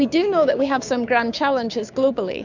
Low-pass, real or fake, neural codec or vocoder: 7.2 kHz; fake; codec, 16 kHz, 6 kbps, DAC